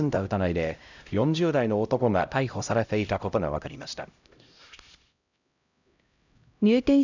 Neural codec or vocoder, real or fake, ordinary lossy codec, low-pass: codec, 16 kHz, 0.5 kbps, X-Codec, HuBERT features, trained on LibriSpeech; fake; none; 7.2 kHz